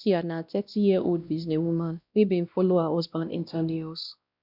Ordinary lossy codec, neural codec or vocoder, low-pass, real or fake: none; codec, 16 kHz, 1 kbps, X-Codec, WavLM features, trained on Multilingual LibriSpeech; 5.4 kHz; fake